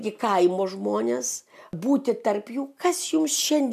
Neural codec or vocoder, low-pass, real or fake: none; 14.4 kHz; real